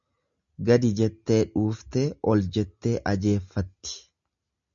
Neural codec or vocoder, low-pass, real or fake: none; 7.2 kHz; real